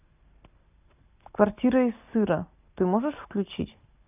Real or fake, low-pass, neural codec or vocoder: real; 3.6 kHz; none